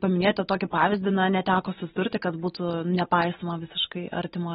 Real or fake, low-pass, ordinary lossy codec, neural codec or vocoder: real; 14.4 kHz; AAC, 16 kbps; none